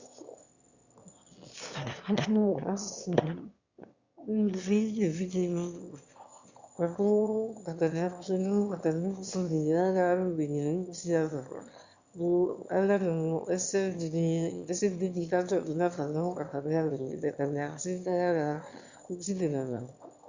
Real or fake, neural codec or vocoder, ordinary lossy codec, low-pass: fake; autoencoder, 22.05 kHz, a latent of 192 numbers a frame, VITS, trained on one speaker; Opus, 64 kbps; 7.2 kHz